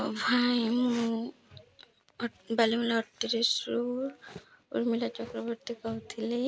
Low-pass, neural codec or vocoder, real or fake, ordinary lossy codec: none; none; real; none